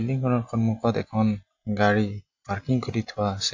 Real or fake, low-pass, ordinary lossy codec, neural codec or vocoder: real; 7.2 kHz; AAC, 48 kbps; none